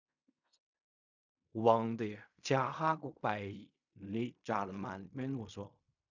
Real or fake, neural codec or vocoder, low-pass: fake; codec, 16 kHz in and 24 kHz out, 0.4 kbps, LongCat-Audio-Codec, fine tuned four codebook decoder; 7.2 kHz